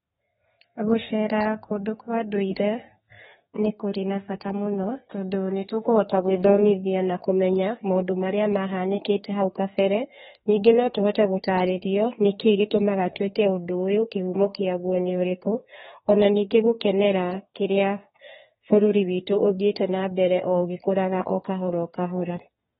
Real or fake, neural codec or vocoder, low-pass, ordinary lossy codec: fake; codec, 32 kHz, 1.9 kbps, SNAC; 14.4 kHz; AAC, 16 kbps